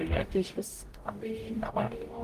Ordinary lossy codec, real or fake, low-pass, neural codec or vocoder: Opus, 24 kbps; fake; 14.4 kHz; codec, 44.1 kHz, 0.9 kbps, DAC